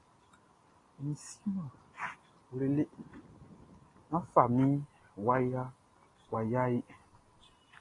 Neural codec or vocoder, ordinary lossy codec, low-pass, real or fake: none; AAC, 32 kbps; 10.8 kHz; real